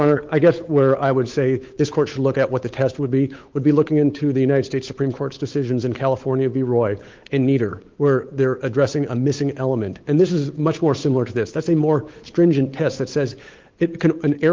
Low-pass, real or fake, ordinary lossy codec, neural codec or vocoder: 7.2 kHz; fake; Opus, 32 kbps; codec, 16 kHz, 8 kbps, FunCodec, trained on Chinese and English, 25 frames a second